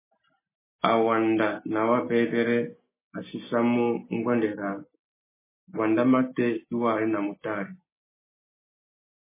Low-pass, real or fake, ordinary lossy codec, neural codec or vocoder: 3.6 kHz; real; MP3, 16 kbps; none